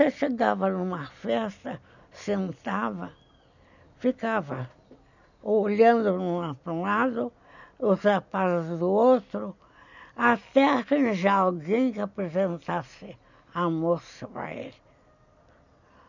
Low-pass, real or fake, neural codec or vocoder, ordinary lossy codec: 7.2 kHz; real; none; none